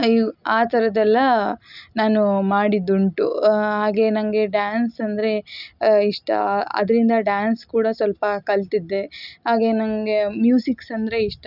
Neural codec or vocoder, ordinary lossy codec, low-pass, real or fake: none; none; 5.4 kHz; real